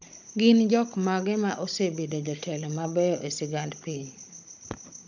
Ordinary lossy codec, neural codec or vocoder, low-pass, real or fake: none; codec, 16 kHz, 16 kbps, FunCodec, trained on Chinese and English, 50 frames a second; 7.2 kHz; fake